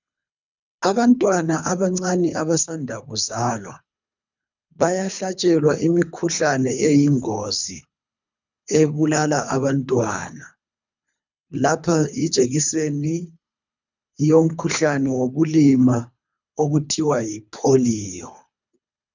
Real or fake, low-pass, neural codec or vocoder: fake; 7.2 kHz; codec, 24 kHz, 3 kbps, HILCodec